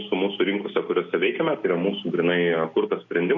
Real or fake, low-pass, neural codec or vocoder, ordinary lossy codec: real; 7.2 kHz; none; MP3, 48 kbps